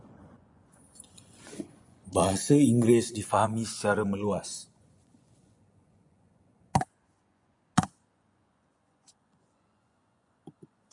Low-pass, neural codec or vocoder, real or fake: 10.8 kHz; vocoder, 24 kHz, 100 mel bands, Vocos; fake